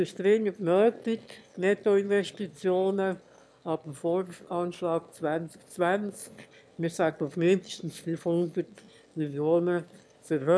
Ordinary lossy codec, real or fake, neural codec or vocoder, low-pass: none; fake; autoencoder, 22.05 kHz, a latent of 192 numbers a frame, VITS, trained on one speaker; none